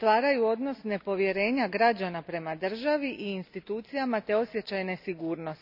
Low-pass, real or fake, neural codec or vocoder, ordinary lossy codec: 5.4 kHz; real; none; none